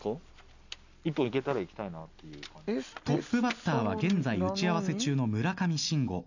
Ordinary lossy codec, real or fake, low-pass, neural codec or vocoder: none; real; 7.2 kHz; none